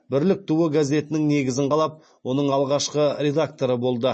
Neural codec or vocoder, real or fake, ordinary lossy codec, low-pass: none; real; MP3, 32 kbps; 9.9 kHz